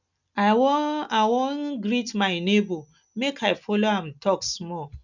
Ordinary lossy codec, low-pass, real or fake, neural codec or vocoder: none; 7.2 kHz; real; none